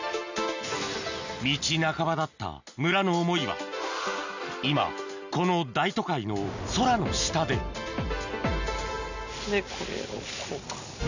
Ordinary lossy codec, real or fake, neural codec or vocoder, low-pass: none; real; none; 7.2 kHz